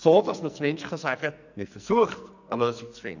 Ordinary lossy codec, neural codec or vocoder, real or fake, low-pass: none; codec, 44.1 kHz, 2.6 kbps, SNAC; fake; 7.2 kHz